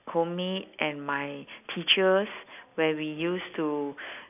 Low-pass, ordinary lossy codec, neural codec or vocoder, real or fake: 3.6 kHz; none; none; real